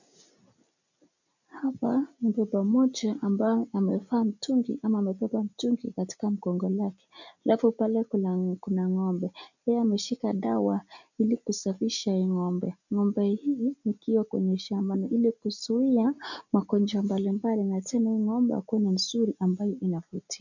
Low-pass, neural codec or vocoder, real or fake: 7.2 kHz; none; real